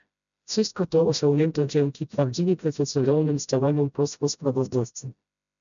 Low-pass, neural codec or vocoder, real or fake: 7.2 kHz; codec, 16 kHz, 0.5 kbps, FreqCodec, smaller model; fake